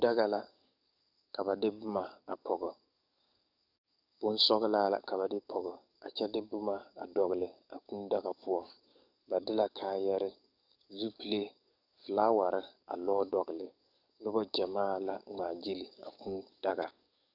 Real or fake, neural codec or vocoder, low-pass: fake; codec, 44.1 kHz, 7.8 kbps, DAC; 5.4 kHz